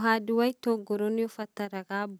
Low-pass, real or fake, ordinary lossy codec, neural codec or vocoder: none; real; none; none